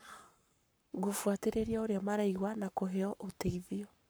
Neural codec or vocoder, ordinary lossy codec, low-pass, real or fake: codec, 44.1 kHz, 7.8 kbps, Pupu-Codec; none; none; fake